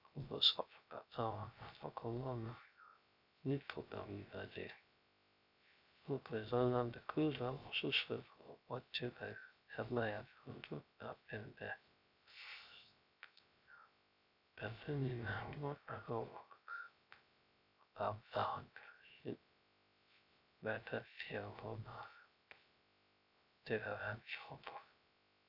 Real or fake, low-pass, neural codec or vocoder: fake; 5.4 kHz; codec, 16 kHz, 0.3 kbps, FocalCodec